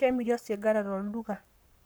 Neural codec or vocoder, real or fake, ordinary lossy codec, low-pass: codec, 44.1 kHz, 7.8 kbps, Pupu-Codec; fake; none; none